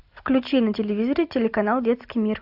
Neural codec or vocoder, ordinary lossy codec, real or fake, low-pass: none; AAC, 48 kbps; real; 5.4 kHz